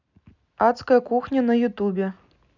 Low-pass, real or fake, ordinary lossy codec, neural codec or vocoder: 7.2 kHz; real; none; none